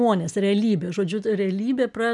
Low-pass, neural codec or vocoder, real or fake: 10.8 kHz; none; real